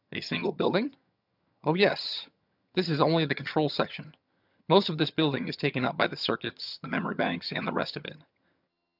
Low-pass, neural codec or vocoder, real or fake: 5.4 kHz; vocoder, 22.05 kHz, 80 mel bands, HiFi-GAN; fake